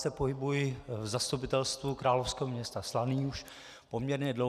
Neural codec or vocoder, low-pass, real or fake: none; 14.4 kHz; real